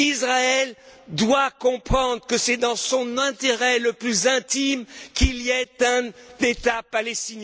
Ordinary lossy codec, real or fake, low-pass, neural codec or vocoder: none; real; none; none